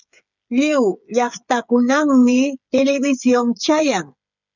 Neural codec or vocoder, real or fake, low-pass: codec, 16 kHz, 8 kbps, FreqCodec, smaller model; fake; 7.2 kHz